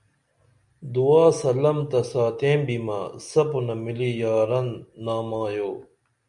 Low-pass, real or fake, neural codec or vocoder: 10.8 kHz; real; none